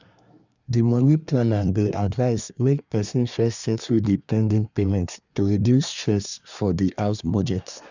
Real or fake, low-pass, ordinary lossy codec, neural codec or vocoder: fake; 7.2 kHz; none; codec, 24 kHz, 1 kbps, SNAC